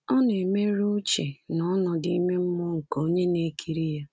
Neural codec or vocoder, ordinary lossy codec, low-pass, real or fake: none; none; none; real